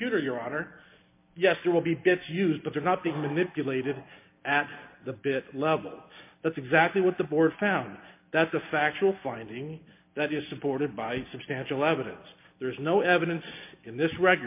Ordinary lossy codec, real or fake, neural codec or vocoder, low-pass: MP3, 24 kbps; real; none; 3.6 kHz